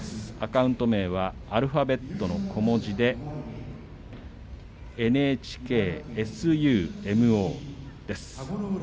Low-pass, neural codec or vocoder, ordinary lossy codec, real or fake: none; none; none; real